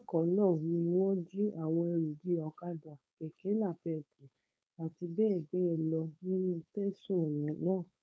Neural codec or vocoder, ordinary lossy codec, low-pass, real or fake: codec, 16 kHz, 4.8 kbps, FACodec; none; none; fake